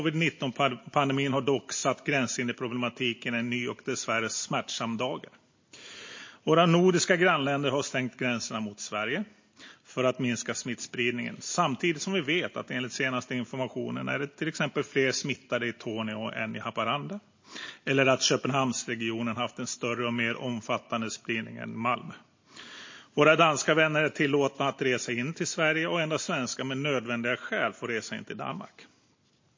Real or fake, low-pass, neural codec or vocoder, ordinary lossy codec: real; 7.2 kHz; none; MP3, 32 kbps